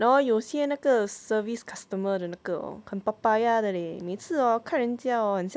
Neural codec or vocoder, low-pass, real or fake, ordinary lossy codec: none; none; real; none